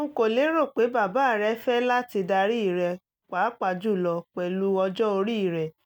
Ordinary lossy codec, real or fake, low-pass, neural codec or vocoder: none; real; 19.8 kHz; none